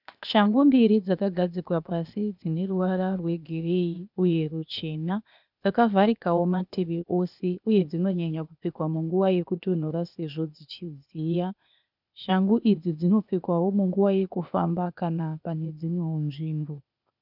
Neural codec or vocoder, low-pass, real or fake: codec, 16 kHz, 0.8 kbps, ZipCodec; 5.4 kHz; fake